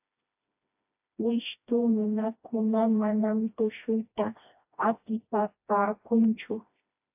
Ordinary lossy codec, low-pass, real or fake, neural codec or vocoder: AAC, 32 kbps; 3.6 kHz; fake; codec, 16 kHz, 1 kbps, FreqCodec, smaller model